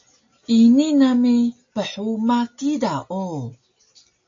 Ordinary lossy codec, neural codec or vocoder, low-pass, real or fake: AAC, 48 kbps; none; 7.2 kHz; real